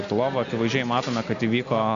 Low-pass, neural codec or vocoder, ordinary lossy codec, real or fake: 7.2 kHz; none; MP3, 64 kbps; real